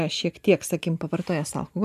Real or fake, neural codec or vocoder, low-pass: real; none; 14.4 kHz